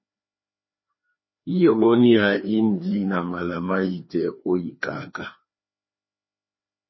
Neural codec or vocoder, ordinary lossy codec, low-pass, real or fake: codec, 16 kHz, 2 kbps, FreqCodec, larger model; MP3, 24 kbps; 7.2 kHz; fake